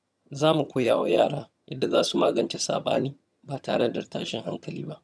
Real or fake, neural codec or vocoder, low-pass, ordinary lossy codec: fake; vocoder, 22.05 kHz, 80 mel bands, HiFi-GAN; none; none